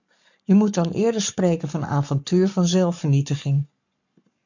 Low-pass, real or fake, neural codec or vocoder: 7.2 kHz; fake; codec, 44.1 kHz, 7.8 kbps, Pupu-Codec